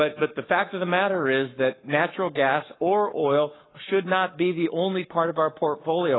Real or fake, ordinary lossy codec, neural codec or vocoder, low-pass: fake; AAC, 16 kbps; codec, 44.1 kHz, 7.8 kbps, Pupu-Codec; 7.2 kHz